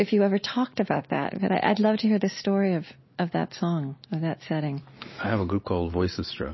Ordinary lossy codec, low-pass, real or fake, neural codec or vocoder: MP3, 24 kbps; 7.2 kHz; real; none